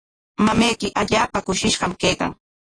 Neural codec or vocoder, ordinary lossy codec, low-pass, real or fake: vocoder, 48 kHz, 128 mel bands, Vocos; AAC, 32 kbps; 9.9 kHz; fake